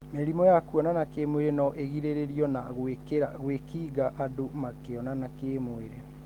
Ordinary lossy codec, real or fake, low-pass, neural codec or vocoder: Opus, 24 kbps; real; 19.8 kHz; none